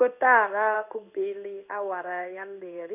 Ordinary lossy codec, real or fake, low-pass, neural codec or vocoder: none; fake; 3.6 kHz; codec, 16 kHz in and 24 kHz out, 1 kbps, XY-Tokenizer